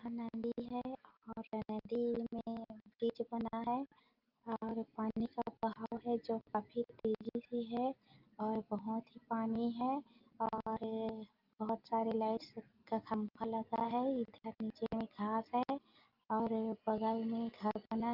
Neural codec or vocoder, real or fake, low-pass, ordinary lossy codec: none; real; 5.4 kHz; Opus, 24 kbps